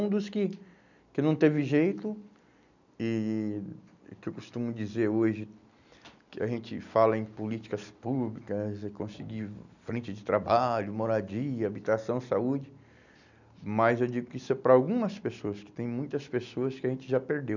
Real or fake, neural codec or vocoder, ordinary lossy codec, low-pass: real; none; none; 7.2 kHz